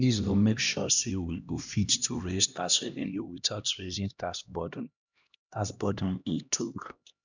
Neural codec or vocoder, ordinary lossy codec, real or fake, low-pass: codec, 16 kHz, 1 kbps, X-Codec, HuBERT features, trained on LibriSpeech; none; fake; 7.2 kHz